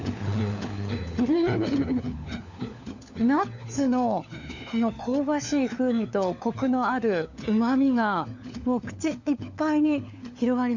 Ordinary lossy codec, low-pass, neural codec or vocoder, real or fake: none; 7.2 kHz; codec, 16 kHz, 4 kbps, FunCodec, trained on LibriTTS, 50 frames a second; fake